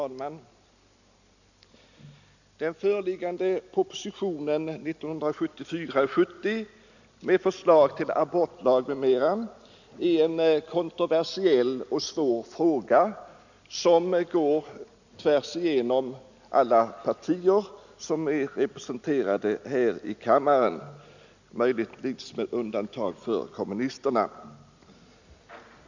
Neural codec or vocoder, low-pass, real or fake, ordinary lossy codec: none; 7.2 kHz; real; none